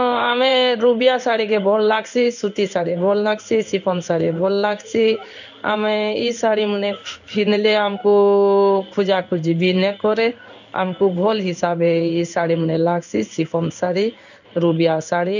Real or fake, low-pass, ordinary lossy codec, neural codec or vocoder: fake; 7.2 kHz; none; codec, 16 kHz in and 24 kHz out, 1 kbps, XY-Tokenizer